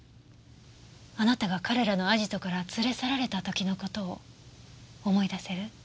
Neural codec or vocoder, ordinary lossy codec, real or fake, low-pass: none; none; real; none